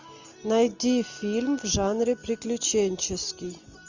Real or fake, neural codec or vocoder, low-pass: real; none; 7.2 kHz